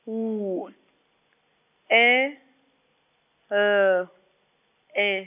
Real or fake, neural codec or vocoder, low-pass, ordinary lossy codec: real; none; 3.6 kHz; none